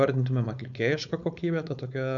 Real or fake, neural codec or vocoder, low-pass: fake; codec, 16 kHz, 4.8 kbps, FACodec; 7.2 kHz